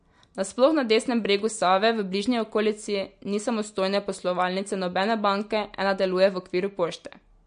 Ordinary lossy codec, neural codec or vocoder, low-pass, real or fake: MP3, 48 kbps; none; 9.9 kHz; real